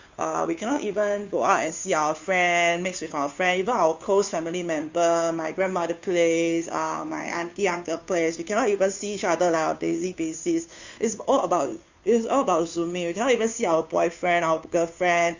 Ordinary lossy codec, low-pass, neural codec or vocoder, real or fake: Opus, 64 kbps; 7.2 kHz; codec, 16 kHz, 2 kbps, FunCodec, trained on Chinese and English, 25 frames a second; fake